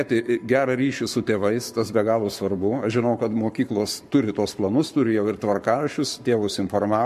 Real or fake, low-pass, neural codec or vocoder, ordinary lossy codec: fake; 14.4 kHz; codec, 44.1 kHz, 7.8 kbps, Pupu-Codec; MP3, 64 kbps